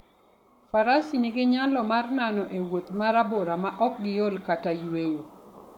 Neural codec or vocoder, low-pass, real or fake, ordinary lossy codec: codec, 44.1 kHz, 7.8 kbps, Pupu-Codec; 19.8 kHz; fake; MP3, 96 kbps